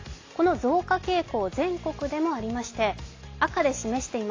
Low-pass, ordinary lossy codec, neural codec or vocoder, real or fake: 7.2 kHz; AAC, 32 kbps; none; real